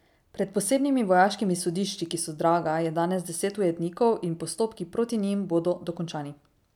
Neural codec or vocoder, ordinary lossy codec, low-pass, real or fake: none; none; 19.8 kHz; real